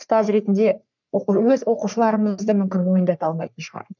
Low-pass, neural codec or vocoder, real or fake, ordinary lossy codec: 7.2 kHz; codec, 44.1 kHz, 3.4 kbps, Pupu-Codec; fake; none